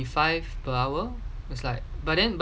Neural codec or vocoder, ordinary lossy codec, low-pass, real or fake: none; none; none; real